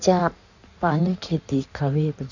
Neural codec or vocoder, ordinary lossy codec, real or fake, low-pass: codec, 16 kHz in and 24 kHz out, 1.1 kbps, FireRedTTS-2 codec; none; fake; 7.2 kHz